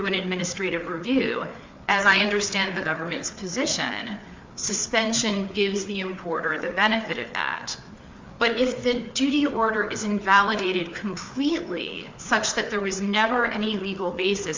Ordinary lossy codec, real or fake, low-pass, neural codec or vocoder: MP3, 64 kbps; fake; 7.2 kHz; codec, 16 kHz, 4 kbps, FreqCodec, larger model